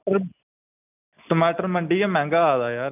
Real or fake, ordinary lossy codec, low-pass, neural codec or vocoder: real; none; 3.6 kHz; none